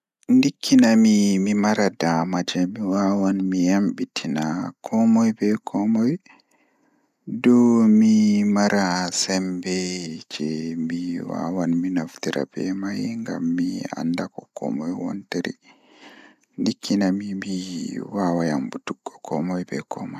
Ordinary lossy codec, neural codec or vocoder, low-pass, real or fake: none; none; 14.4 kHz; real